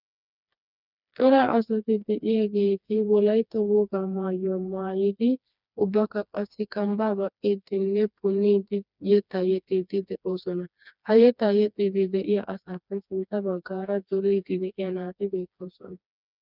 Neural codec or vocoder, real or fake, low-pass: codec, 16 kHz, 2 kbps, FreqCodec, smaller model; fake; 5.4 kHz